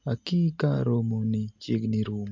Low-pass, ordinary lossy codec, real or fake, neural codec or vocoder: 7.2 kHz; MP3, 48 kbps; real; none